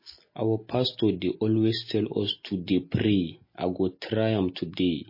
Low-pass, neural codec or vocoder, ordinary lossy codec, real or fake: 5.4 kHz; none; MP3, 24 kbps; real